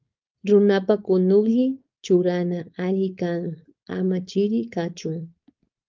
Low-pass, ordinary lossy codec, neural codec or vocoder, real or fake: 7.2 kHz; Opus, 32 kbps; codec, 16 kHz, 4.8 kbps, FACodec; fake